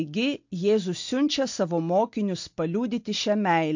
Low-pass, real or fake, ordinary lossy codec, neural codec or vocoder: 7.2 kHz; real; MP3, 48 kbps; none